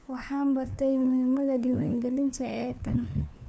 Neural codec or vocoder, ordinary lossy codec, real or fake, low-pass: codec, 16 kHz, 2 kbps, FunCodec, trained on LibriTTS, 25 frames a second; none; fake; none